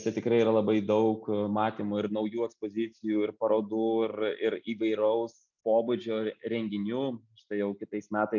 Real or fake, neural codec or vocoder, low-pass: real; none; 7.2 kHz